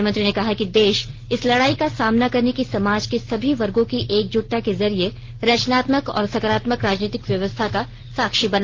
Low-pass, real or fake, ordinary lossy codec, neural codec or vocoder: 7.2 kHz; real; Opus, 16 kbps; none